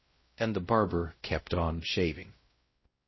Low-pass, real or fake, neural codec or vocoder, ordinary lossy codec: 7.2 kHz; fake; codec, 16 kHz, 0.5 kbps, X-Codec, WavLM features, trained on Multilingual LibriSpeech; MP3, 24 kbps